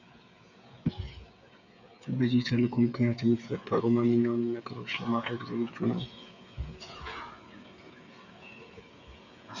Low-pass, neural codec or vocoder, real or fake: 7.2 kHz; codec, 16 kHz, 8 kbps, FreqCodec, smaller model; fake